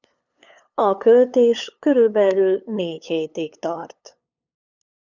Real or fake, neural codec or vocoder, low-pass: fake; codec, 16 kHz, 8 kbps, FunCodec, trained on LibriTTS, 25 frames a second; 7.2 kHz